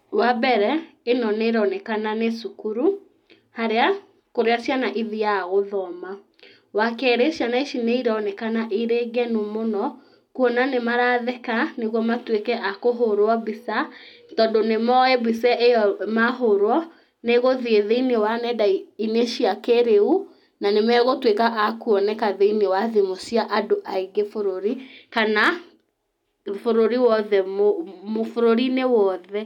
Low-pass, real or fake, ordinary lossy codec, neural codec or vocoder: 19.8 kHz; real; none; none